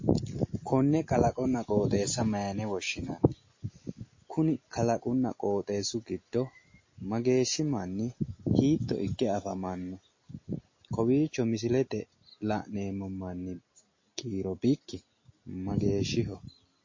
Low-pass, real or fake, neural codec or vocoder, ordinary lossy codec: 7.2 kHz; real; none; MP3, 32 kbps